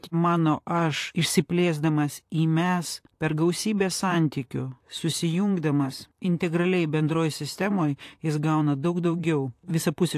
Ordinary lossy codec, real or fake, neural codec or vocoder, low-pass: AAC, 64 kbps; fake; vocoder, 44.1 kHz, 128 mel bands, Pupu-Vocoder; 14.4 kHz